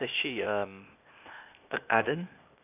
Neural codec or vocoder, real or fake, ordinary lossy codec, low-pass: codec, 16 kHz, 0.8 kbps, ZipCodec; fake; none; 3.6 kHz